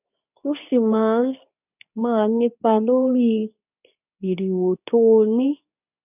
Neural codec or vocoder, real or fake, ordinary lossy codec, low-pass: codec, 24 kHz, 0.9 kbps, WavTokenizer, medium speech release version 2; fake; none; 3.6 kHz